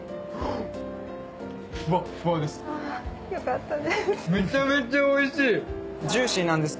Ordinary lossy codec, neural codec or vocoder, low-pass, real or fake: none; none; none; real